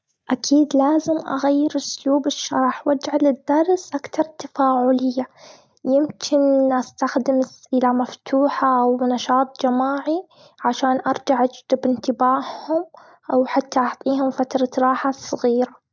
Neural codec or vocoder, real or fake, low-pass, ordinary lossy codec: none; real; none; none